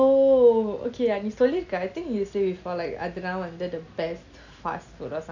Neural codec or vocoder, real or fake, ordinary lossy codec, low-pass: none; real; none; 7.2 kHz